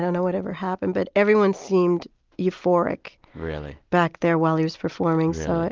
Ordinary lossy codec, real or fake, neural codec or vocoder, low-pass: Opus, 32 kbps; real; none; 7.2 kHz